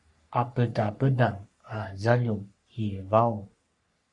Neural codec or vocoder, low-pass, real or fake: codec, 44.1 kHz, 3.4 kbps, Pupu-Codec; 10.8 kHz; fake